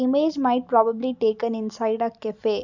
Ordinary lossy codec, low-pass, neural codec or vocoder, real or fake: none; 7.2 kHz; none; real